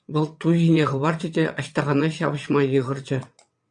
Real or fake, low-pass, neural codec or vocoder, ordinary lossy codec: fake; 9.9 kHz; vocoder, 22.05 kHz, 80 mel bands, WaveNeXt; MP3, 96 kbps